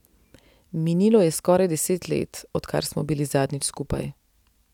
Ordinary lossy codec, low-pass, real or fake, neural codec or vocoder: none; 19.8 kHz; real; none